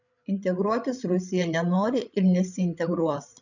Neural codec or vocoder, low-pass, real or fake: codec, 16 kHz, 16 kbps, FreqCodec, larger model; 7.2 kHz; fake